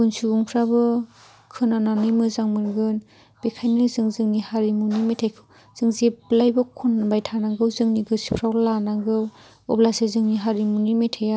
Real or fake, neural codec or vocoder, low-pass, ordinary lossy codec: real; none; none; none